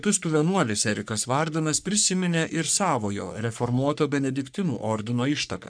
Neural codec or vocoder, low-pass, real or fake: codec, 44.1 kHz, 3.4 kbps, Pupu-Codec; 9.9 kHz; fake